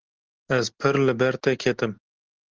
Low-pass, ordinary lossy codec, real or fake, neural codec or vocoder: 7.2 kHz; Opus, 16 kbps; real; none